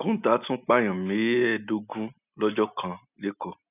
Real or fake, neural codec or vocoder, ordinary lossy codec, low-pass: real; none; none; 3.6 kHz